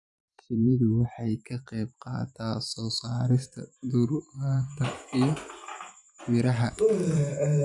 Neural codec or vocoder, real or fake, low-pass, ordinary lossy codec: vocoder, 44.1 kHz, 128 mel bands every 512 samples, BigVGAN v2; fake; 10.8 kHz; none